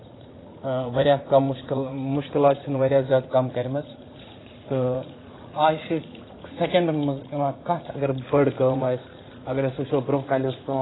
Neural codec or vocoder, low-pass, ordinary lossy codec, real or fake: vocoder, 44.1 kHz, 80 mel bands, Vocos; 7.2 kHz; AAC, 16 kbps; fake